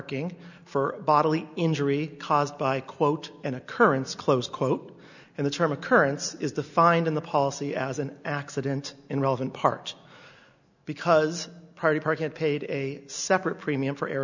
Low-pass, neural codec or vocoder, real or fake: 7.2 kHz; none; real